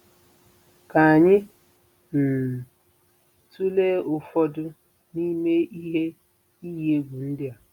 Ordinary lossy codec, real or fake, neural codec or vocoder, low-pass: none; real; none; 19.8 kHz